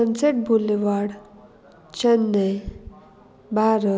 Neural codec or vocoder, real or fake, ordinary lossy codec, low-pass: none; real; none; none